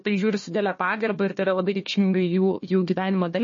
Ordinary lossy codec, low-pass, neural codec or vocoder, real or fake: MP3, 32 kbps; 7.2 kHz; codec, 16 kHz, 1 kbps, FunCodec, trained on Chinese and English, 50 frames a second; fake